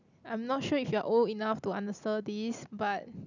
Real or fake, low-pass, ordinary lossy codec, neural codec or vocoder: real; 7.2 kHz; none; none